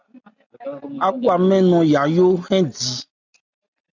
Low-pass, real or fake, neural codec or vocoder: 7.2 kHz; real; none